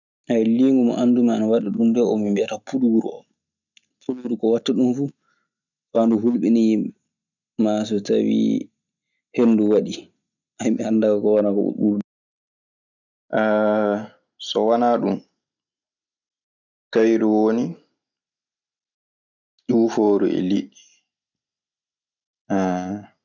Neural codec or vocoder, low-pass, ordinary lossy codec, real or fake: none; 7.2 kHz; none; real